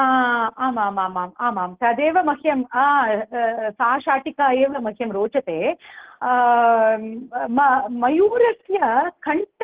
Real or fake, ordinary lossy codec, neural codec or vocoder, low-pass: real; Opus, 16 kbps; none; 3.6 kHz